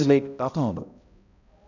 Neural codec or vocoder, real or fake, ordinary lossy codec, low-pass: codec, 16 kHz, 0.5 kbps, X-Codec, HuBERT features, trained on balanced general audio; fake; none; 7.2 kHz